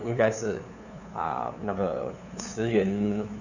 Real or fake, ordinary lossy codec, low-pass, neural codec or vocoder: fake; none; 7.2 kHz; codec, 16 kHz, 2 kbps, FunCodec, trained on LibriTTS, 25 frames a second